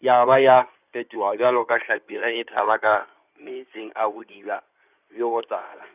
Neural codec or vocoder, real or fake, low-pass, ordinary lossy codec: codec, 16 kHz in and 24 kHz out, 2.2 kbps, FireRedTTS-2 codec; fake; 3.6 kHz; none